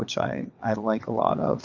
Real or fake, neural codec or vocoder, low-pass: fake; vocoder, 22.05 kHz, 80 mel bands, WaveNeXt; 7.2 kHz